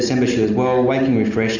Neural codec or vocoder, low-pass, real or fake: none; 7.2 kHz; real